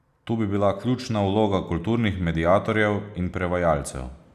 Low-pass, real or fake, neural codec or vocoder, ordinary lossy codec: 14.4 kHz; real; none; none